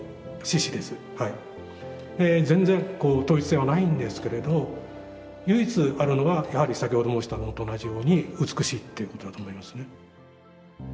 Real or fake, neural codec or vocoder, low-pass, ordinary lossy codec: real; none; none; none